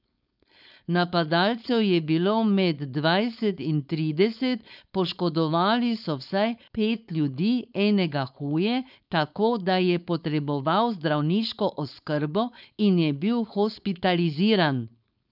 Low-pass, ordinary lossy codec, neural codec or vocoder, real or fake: 5.4 kHz; none; codec, 16 kHz, 4.8 kbps, FACodec; fake